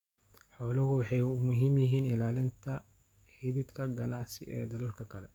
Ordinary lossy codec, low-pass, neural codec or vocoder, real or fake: none; 19.8 kHz; codec, 44.1 kHz, 7.8 kbps, DAC; fake